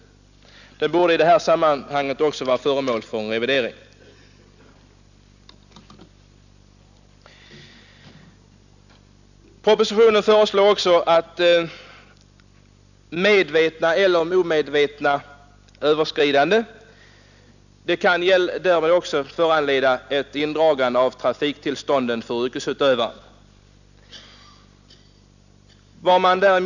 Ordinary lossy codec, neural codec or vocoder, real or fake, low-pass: none; none; real; 7.2 kHz